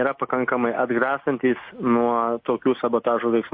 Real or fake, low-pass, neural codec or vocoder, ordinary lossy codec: real; 5.4 kHz; none; MP3, 32 kbps